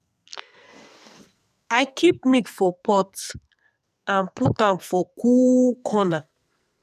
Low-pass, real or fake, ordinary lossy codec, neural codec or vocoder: 14.4 kHz; fake; none; codec, 44.1 kHz, 2.6 kbps, SNAC